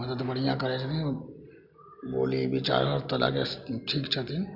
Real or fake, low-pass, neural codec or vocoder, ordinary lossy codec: real; 5.4 kHz; none; none